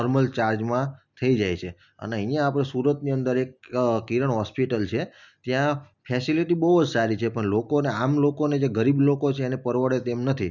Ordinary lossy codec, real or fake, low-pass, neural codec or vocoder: none; real; 7.2 kHz; none